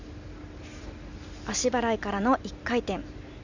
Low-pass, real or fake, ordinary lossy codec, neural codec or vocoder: 7.2 kHz; real; Opus, 64 kbps; none